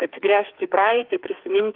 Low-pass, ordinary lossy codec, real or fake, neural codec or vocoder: 5.4 kHz; Opus, 32 kbps; fake; codec, 44.1 kHz, 2.6 kbps, SNAC